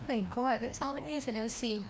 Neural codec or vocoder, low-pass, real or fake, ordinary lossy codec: codec, 16 kHz, 1 kbps, FreqCodec, larger model; none; fake; none